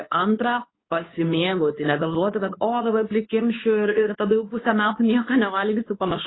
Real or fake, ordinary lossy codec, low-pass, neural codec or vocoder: fake; AAC, 16 kbps; 7.2 kHz; codec, 24 kHz, 0.9 kbps, WavTokenizer, medium speech release version 2